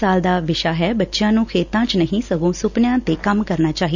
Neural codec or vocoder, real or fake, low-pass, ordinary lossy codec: none; real; 7.2 kHz; none